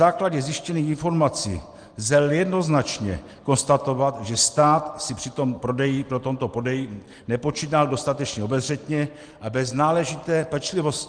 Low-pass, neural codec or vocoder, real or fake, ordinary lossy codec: 9.9 kHz; none; real; Opus, 24 kbps